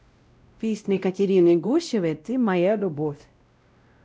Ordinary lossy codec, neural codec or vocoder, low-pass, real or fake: none; codec, 16 kHz, 0.5 kbps, X-Codec, WavLM features, trained on Multilingual LibriSpeech; none; fake